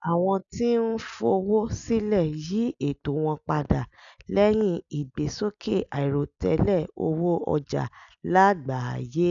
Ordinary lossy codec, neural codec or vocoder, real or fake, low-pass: none; none; real; 7.2 kHz